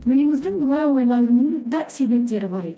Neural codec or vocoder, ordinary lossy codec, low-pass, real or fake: codec, 16 kHz, 1 kbps, FreqCodec, smaller model; none; none; fake